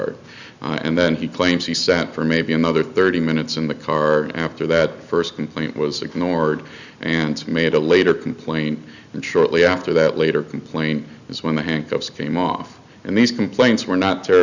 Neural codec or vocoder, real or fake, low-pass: none; real; 7.2 kHz